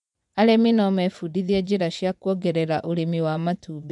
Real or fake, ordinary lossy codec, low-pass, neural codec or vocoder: fake; none; 10.8 kHz; vocoder, 24 kHz, 100 mel bands, Vocos